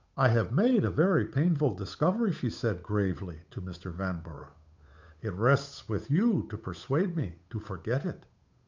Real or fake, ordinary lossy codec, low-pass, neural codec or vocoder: fake; MP3, 64 kbps; 7.2 kHz; codec, 16 kHz, 8 kbps, FunCodec, trained on Chinese and English, 25 frames a second